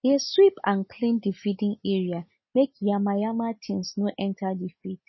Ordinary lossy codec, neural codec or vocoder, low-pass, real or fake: MP3, 24 kbps; none; 7.2 kHz; real